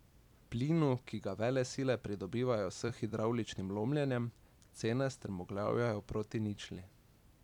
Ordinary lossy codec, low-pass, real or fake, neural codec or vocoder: none; 19.8 kHz; fake; vocoder, 44.1 kHz, 128 mel bands every 256 samples, BigVGAN v2